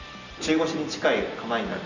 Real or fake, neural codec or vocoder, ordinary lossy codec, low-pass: real; none; none; 7.2 kHz